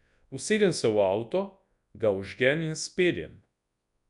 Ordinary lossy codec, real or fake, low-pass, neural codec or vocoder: none; fake; 10.8 kHz; codec, 24 kHz, 0.9 kbps, WavTokenizer, large speech release